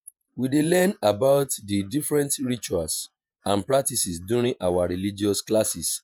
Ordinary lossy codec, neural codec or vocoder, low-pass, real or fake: none; vocoder, 48 kHz, 128 mel bands, Vocos; none; fake